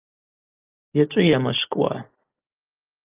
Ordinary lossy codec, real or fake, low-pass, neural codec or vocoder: Opus, 32 kbps; real; 3.6 kHz; none